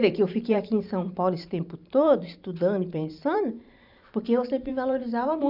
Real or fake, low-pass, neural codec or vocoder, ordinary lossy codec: fake; 5.4 kHz; vocoder, 44.1 kHz, 128 mel bands every 512 samples, BigVGAN v2; none